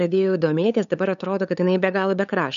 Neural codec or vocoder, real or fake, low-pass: codec, 16 kHz, 8 kbps, FunCodec, trained on LibriTTS, 25 frames a second; fake; 7.2 kHz